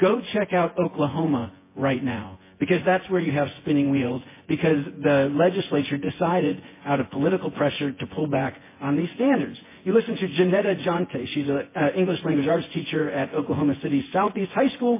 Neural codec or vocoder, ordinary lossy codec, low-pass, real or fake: vocoder, 24 kHz, 100 mel bands, Vocos; MP3, 16 kbps; 3.6 kHz; fake